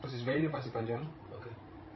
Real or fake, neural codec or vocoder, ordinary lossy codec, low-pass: fake; codec, 16 kHz, 16 kbps, FreqCodec, larger model; MP3, 24 kbps; 7.2 kHz